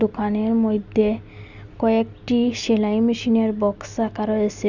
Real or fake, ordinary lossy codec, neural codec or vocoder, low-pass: real; none; none; 7.2 kHz